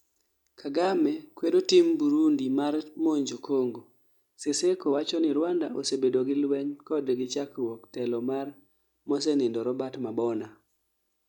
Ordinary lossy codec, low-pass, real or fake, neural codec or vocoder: none; 19.8 kHz; real; none